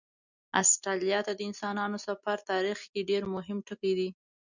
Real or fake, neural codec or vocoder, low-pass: real; none; 7.2 kHz